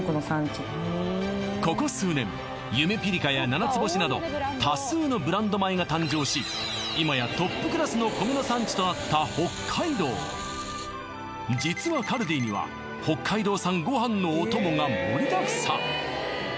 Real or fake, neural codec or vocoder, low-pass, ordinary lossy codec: real; none; none; none